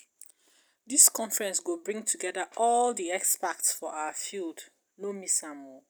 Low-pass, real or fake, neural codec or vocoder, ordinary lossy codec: none; real; none; none